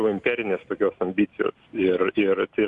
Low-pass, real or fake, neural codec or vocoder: 10.8 kHz; real; none